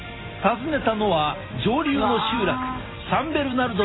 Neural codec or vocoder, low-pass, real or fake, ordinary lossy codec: none; 7.2 kHz; real; AAC, 16 kbps